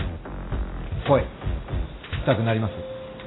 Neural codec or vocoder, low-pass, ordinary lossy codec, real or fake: none; 7.2 kHz; AAC, 16 kbps; real